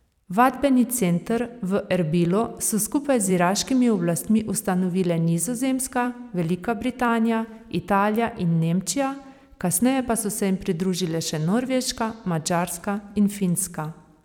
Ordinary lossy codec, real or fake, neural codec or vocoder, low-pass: none; fake; vocoder, 44.1 kHz, 128 mel bands every 256 samples, BigVGAN v2; 19.8 kHz